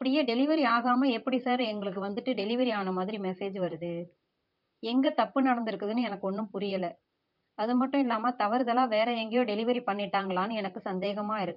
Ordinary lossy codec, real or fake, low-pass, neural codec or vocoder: none; fake; 5.4 kHz; vocoder, 44.1 kHz, 128 mel bands, Pupu-Vocoder